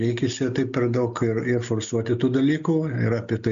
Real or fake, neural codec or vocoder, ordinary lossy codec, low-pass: real; none; AAC, 96 kbps; 7.2 kHz